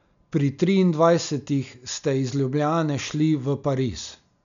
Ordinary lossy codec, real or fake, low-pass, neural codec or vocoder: none; real; 7.2 kHz; none